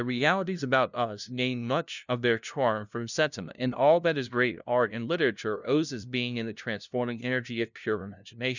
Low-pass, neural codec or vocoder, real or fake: 7.2 kHz; codec, 16 kHz, 0.5 kbps, FunCodec, trained on LibriTTS, 25 frames a second; fake